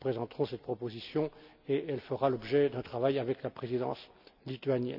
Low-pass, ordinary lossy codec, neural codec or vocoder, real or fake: 5.4 kHz; none; none; real